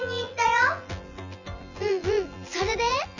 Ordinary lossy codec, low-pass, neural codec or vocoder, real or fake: none; 7.2 kHz; vocoder, 24 kHz, 100 mel bands, Vocos; fake